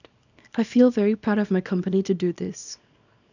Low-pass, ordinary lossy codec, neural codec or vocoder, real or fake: 7.2 kHz; none; codec, 24 kHz, 0.9 kbps, WavTokenizer, small release; fake